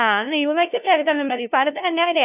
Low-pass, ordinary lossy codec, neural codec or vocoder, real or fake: 3.6 kHz; none; codec, 16 kHz, 0.5 kbps, FunCodec, trained on LibriTTS, 25 frames a second; fake